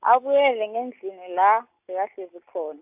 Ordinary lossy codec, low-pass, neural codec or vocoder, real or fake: AAC, 32 kbps; 3.6 kHz; none; real